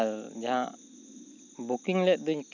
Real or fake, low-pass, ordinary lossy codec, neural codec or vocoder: real; 7.2 kHz; none; none